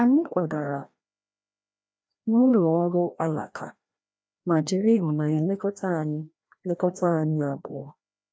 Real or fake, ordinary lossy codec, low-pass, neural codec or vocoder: fake; none; none; codec, 16 kHz, 1 kbps, FreqCodec, larger model